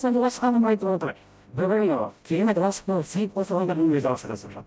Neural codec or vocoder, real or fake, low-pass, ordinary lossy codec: codec, 16 kHz, 0.5 kbps, FreqCodec, smaller model; fake; none; none